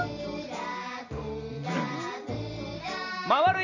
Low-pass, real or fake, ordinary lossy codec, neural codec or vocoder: 7.2 kHz; real; none; none